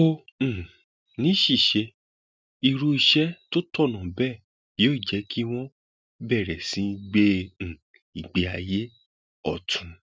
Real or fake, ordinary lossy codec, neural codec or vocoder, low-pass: real; none; none; none